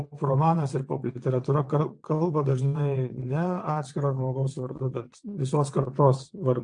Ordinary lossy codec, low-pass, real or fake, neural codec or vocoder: AAC, 64 kbps; 9.9 kHz; fake; vocoder, 22.05 kHz, 80 mel bands, WaveNeXt